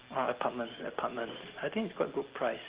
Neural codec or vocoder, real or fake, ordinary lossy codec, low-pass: none; real; Opus, 16 kbps; 3.6 kHz